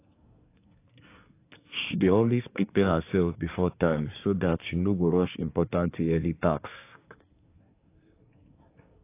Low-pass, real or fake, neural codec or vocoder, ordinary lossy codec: 3.6 kHz; fake; codec, 24 kHz, 1 kbps, SNAC; AAC, 24 kbps